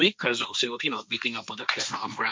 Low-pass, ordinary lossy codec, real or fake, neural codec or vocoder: none; none; fake; codec, 16 kHz, 1.1 kbps, Voila-Tokenizer